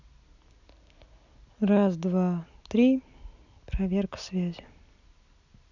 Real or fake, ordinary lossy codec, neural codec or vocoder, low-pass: real; none; none; 7.2 kHz